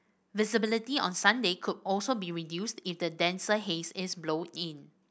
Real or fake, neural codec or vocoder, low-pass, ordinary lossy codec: real; none; none; none